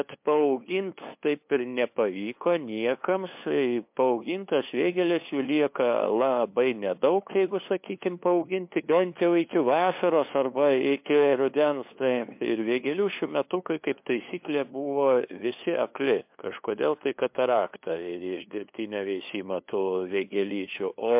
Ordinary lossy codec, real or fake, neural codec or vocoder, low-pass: MP3, 32 kbps; fake; codec, 16 kHz, 2 kbps, FunCodec, trained on LibriTTS, 25 frames a second; 3.6 kHz